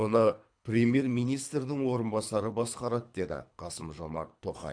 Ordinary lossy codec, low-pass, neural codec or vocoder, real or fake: AAC, 64 kbps; 9.9 kHz; codec, 24 kHz, 3 kbps, HILCodec; fake